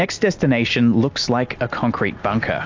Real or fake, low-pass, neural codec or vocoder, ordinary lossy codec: real; 7.2 kHz; none; AAC, 48 kbps